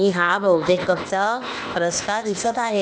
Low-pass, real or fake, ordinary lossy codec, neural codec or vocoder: none; fake; none; codec, 16 kHz, 0.8 kbps, ZipCodec